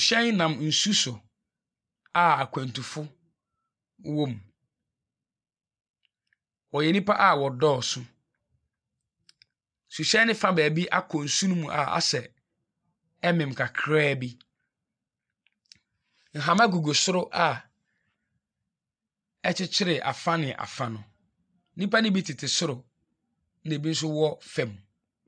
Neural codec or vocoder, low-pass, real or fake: none; 9.9 kHz; real